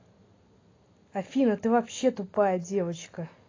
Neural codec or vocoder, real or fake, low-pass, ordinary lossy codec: none; real; 7.2 kHz; AAC, 32 kbps